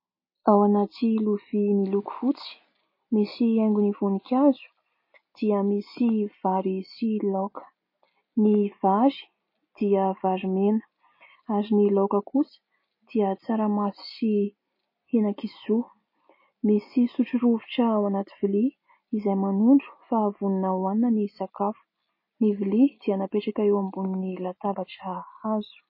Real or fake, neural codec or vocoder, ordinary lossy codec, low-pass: real; none; MP3, 24 kbps; 5.4 kHz